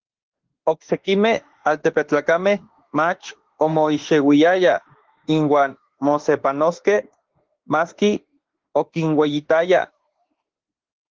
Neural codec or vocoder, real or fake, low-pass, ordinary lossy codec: autoencoder, 48 kHz, 32 numbers a frame, DAC-VAE, trained on Japanese speech; fake; 7.2 kHz; Opus, 16 kbps